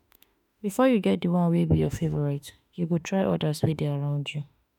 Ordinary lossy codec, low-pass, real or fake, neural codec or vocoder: none; 19.8 kHz; fake; autoencoder, 48 kHz, 32 numbers a frame, DAC-VAE, trained on Japanese speech